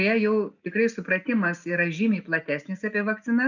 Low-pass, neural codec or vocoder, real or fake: 7.2 kHz; none; real